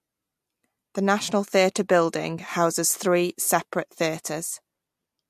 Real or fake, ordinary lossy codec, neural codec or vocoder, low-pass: real; MP3, 64 kbps; none; 14.4 kHz